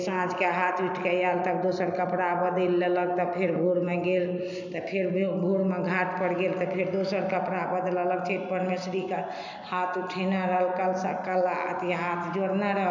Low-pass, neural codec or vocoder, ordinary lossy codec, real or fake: 7.2 kHz; none; none; real